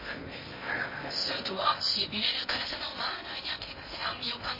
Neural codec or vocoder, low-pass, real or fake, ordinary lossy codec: codec, 16 kHz in and 24 kHz out, 0.6 kbps, FocalCodec, streaming, 2048 codes; 5.4 kHz; fake; MP3, 24 kbps